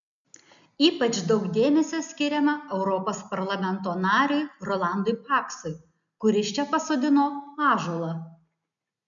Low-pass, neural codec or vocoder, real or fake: 7.2 kHz; none; real